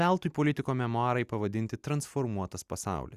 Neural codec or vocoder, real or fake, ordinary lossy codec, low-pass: none; real; Opus, 64 kbps; 14.4 kHz